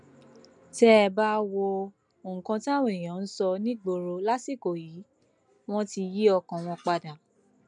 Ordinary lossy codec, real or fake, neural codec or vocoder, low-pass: none; real; none; 9.9 kHz